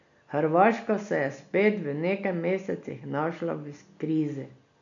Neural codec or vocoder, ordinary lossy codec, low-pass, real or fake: none; MP3, 96 kbps; 7.2 kHz; real